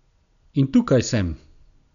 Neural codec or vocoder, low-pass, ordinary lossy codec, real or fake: none; 7.2 kHz; none; real